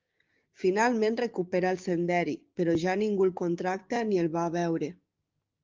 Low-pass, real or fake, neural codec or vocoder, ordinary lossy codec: 7.2 kHz; fake; codec, 16 kHz, 6 kbps, DAC; Opus, 32 kbps